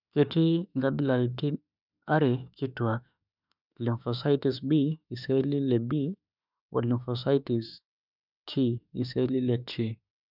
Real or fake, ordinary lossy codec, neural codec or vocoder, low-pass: fake; none; autoencoder, 48 kHz, 32 numbers a frame, DAC-VAE, trained on Japanese speech; 5.4 kHz